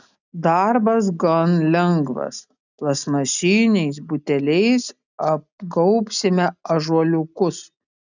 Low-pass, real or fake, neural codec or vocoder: 7.2 kHz; real; none